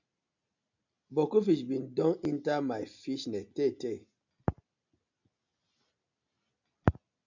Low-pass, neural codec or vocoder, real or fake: 7.2 kHz; none; real